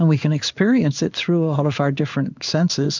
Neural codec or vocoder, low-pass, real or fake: codec, 16 kHz in and 24 kHz out, 1 kbps, XY-Tokenizer; 7.2 kHz; fake